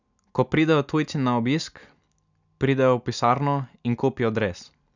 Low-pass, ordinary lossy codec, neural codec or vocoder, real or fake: 7.2 kHz; none; none; real